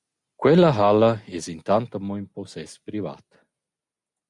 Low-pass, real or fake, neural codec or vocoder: 10.8 kHz; real; none